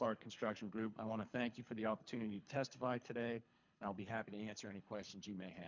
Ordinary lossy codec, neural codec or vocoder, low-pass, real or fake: MP3, 64 kbps; codec, 24 kHz, 3 kbps, HILCodec; 7.2 kHz; fake